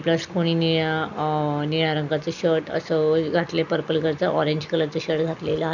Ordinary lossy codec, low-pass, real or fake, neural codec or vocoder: none; 7.2 kHz; real; none